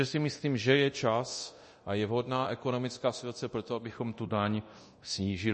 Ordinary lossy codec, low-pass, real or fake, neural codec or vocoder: MP3, 32 kbps; 10.8 kHz; fake; codec, 24 kHz, 0.9 kbps, DualCodec